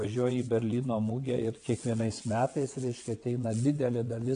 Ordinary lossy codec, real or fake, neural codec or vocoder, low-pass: MP3, 64 kbps; fake; vocoder, 22.05 kHz, 80 mel bands, WaveNeXt; 9.9 kHz